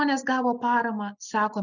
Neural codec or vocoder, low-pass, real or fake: none; 7.2 kHz; real